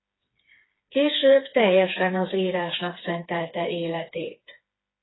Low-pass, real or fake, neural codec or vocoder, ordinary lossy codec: 7.2 kHz; fake; codec, 16 kHz, 4 kbps, FreqCodec, smaller model; AAC, 16 kbps